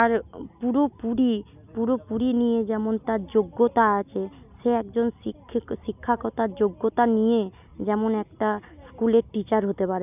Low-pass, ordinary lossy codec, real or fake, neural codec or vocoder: 3.6 kHz; none; real; none